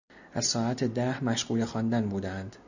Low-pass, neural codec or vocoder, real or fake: 7.2 kHz; none; real